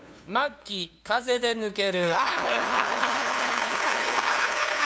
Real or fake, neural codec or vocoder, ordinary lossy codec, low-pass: fake; codec, 16 kHz, 2 kbps, FunCodec, trained on LibriTTS, 25 frames a second; none; none